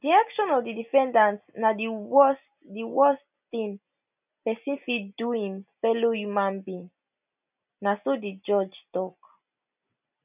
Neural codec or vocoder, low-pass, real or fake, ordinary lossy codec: none; 3.6 kHz; real; none